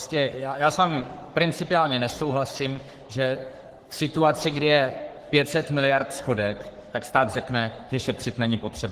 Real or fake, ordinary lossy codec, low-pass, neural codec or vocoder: fake; Opus, 16 kbps; 14.4 kHz; codec, 44.1 kHz, 3.4 kbps, Pupu-Codec